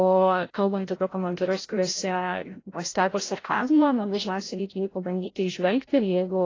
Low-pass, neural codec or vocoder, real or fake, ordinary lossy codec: 7.2 kHz; codec, 16 kHz, 0.5 kbps, FreqCodec, larger model; fake; AAC, 32 kbps